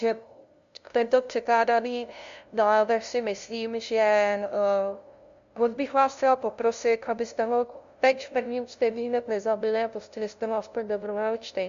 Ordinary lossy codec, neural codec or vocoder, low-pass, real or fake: MP3, 64 kbps; codec, 16 kHz, 0.5 kbps, FunCodec, trained on LibriTTS, 25 frames a second; 7.2 kHz; fake